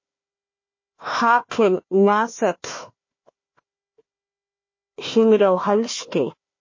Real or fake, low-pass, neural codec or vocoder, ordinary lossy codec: fake; 7.2 kHz; codec, 16 kHz, 1 kbps, FunCodec, trained on Chinese and English, 50 frames a second; MP3, 32 kbps